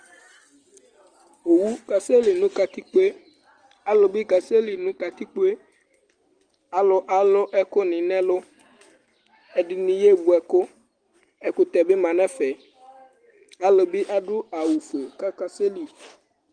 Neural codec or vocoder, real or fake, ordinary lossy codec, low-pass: none; real; Opus, 24 kbps; 9.9 kHz